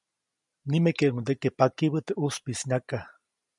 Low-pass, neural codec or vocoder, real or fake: 10.8 kHz; none; real